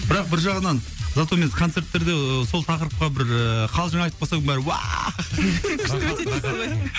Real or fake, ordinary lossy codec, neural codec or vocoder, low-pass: real; none; none; none